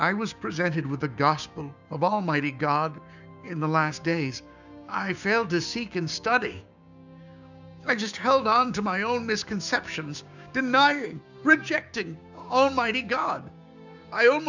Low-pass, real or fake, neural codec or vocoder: 7.2 kHz; fake; codec, 16 kHz, 6 kbps, DAC